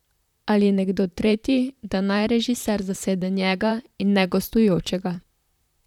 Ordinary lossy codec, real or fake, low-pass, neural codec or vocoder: none; fake; 19.8 kHz; vocoder, 44.1 kHz, 128 mel bands, Pupu-Vocoder